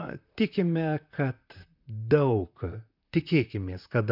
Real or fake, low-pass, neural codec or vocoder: fake; 5.4 kHz; vocoder, 44.1 kHz, 128 mel bands, Pupu-Vocoder